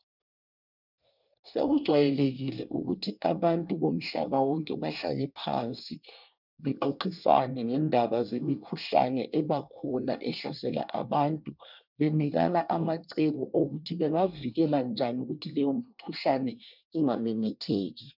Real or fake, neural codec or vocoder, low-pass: fake; codec, 24 kHz, 1 kbps, SNAC; 5.4 kHz